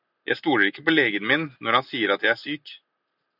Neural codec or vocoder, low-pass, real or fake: none; 5.4 kHz; real